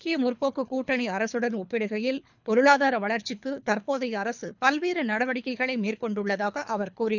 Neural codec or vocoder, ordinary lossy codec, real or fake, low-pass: codec, 24 kHz, 3 kbps, HILCodec; none; fake; 7.2 kHz